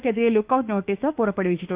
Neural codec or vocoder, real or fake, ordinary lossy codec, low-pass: autoencoder, 48 kHz, 32 numbers a frame, DAC-VAE, trained on Japanese speech; fake; Opus, 24 kbps; 3.6 kHz